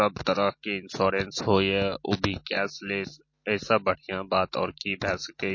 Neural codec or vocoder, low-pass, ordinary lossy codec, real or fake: none; 7.2 kHz; MP3, 32 kbps; real